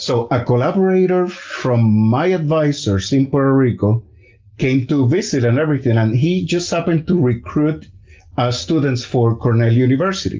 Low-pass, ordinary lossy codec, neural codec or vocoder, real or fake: 7.2 kHz; Opus, 24 kbps; none; real